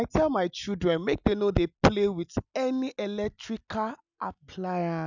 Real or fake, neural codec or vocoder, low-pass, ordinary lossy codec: real; none; 7.2 kHz; none